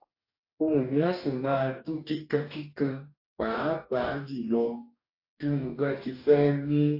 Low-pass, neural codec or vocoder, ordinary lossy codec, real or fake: 5.4 kHz; codec, 44.1 kHz, 2.6 kbps, DAC; AAC, 32 kbps; fake